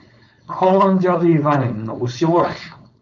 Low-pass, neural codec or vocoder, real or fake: 7.2 kHz; codec, 16 kHz, 4.8 kbps, FACodec; fake